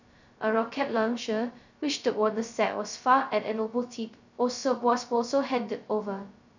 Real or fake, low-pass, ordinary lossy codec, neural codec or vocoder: fake; 7.2 kHz; none; codec, 16 kHz, 0.2 kbps, FocalCodec